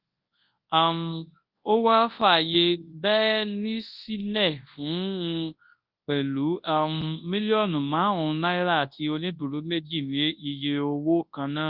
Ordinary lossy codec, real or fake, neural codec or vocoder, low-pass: Opus, 24 kbps; fake; codec, 24 kHz, 0.9 kbps, WavTokenizer, large speech release; 5.4 kHz